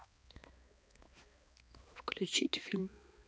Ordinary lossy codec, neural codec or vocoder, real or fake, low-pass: none; codec, 16 kHz, 2 kbps, X-Codec, HuBERT features, trained on balanced general audio; fake; none